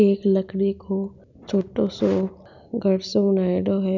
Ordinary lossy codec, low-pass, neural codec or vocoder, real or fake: none; 7.2 kHz; none; real